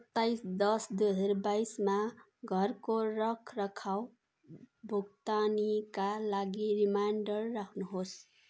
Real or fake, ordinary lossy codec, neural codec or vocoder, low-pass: real; none; none; none